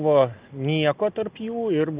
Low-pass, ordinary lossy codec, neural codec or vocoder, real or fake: 3.6 kHz; Opus, 32 kbps; none; real